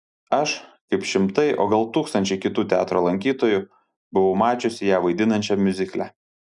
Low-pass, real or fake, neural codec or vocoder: 10.8 kHz; real; none